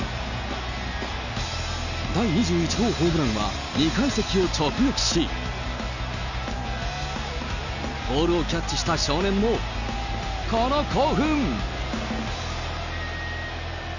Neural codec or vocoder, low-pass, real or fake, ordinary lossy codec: none; 7.2 kHz; real; none